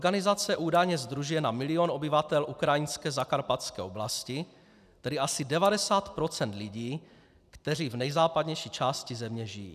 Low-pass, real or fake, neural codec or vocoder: 14.4 kHz; real; none